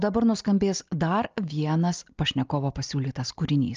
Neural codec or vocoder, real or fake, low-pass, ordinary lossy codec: none; real; 7.2 kHz; Opus, 24 kbps